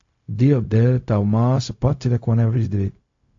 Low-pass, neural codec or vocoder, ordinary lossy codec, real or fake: 7.2 kHz; codec, 16 kHz, 0.4 kbps, LongCat-Audio-Codec; AAC, 48 kbps; fake